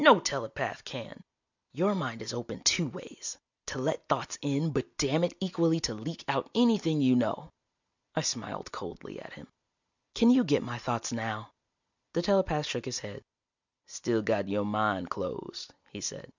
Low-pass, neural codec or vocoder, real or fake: 7.2 kHz; none; real